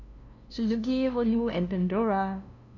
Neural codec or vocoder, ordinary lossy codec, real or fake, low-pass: codec, 16 kHz, 0.5 kbps, FunCodec, trained on LibriTTS, 25 frames a second; none; fake; 7.2 kHz